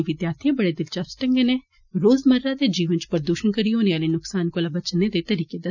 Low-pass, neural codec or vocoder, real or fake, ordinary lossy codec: 7.2 kHz; none; real; none